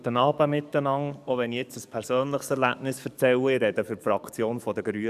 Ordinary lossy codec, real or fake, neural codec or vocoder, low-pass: none; fake; codec, 44.1 kHz, 7.8 kbps, DAC; 14.4 kHz